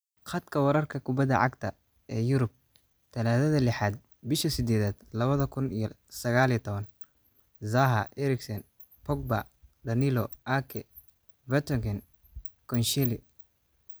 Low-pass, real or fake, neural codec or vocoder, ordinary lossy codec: none; real; none; none